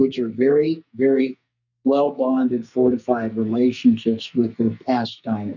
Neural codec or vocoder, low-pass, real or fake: codec, 44.1 kHz, 2.6 kbps, SNAC; 7.2 kHz; fake